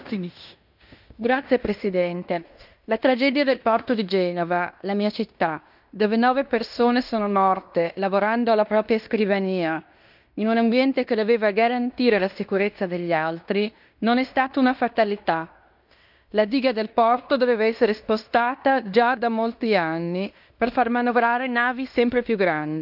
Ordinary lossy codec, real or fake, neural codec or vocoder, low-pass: none; fake; codec, 16 kHz in and 24 kHz out, 0.9 kbps, LongCat-Audio-Codec, fine tuned four codebook decoder; 5.4 kHz